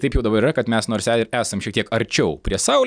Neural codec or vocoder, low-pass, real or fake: none; 9.9 kHz; real